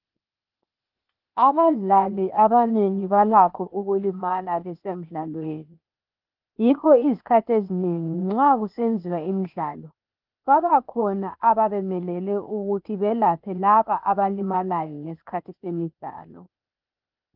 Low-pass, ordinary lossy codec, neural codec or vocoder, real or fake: 5.4 kHz; Opus, 24 kbps; codec, 16 kHz, 0.8 kbps, ZipCodec; fake